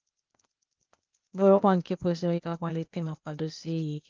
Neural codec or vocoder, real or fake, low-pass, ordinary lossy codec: codec, 16 kHz, 0.8 kbps, ZipCodec; fake; 7.2 kHz; Opus, 32 kbps